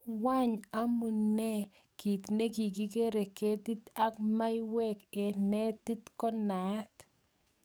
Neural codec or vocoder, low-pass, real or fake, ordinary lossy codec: codec, 44.1 kHz, 7.8 kbps, DAC; none; fake; none